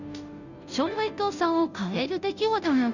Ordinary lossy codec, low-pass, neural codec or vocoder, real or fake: none; 7.2 kHz; codec, 16 kHz, 0.5 kbps, FunCodec, trained on Chinese and English, 25 frames a second; fake